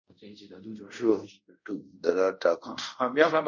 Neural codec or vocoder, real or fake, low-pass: codec, 24 kHz, 0.5 kbps, DualCodec; fake; 7.2 kHz